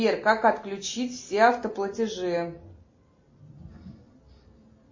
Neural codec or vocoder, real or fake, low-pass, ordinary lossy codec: none; real; 7.2 kHz; MP3, 32 kbps